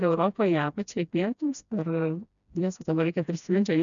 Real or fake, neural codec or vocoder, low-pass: fake; codec, 16 kHz, 1 kbps, FreqCodec, smaller model; 7.2 kHz